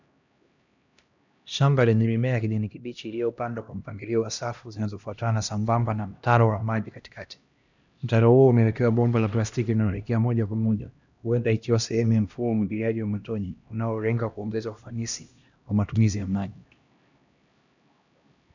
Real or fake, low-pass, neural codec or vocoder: fake; 7.2 kHz; codec, 16 kHz, 1 kbps, X-Codec, HuBERT features, trained on LibriSpeech